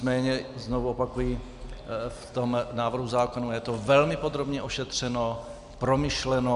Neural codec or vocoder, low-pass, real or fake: none; 10.8 kHz; real